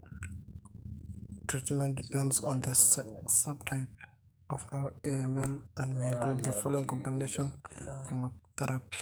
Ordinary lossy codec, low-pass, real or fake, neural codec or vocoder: none; none; fake; codec, 44.1 kHz, 2.6 kbps, SNAC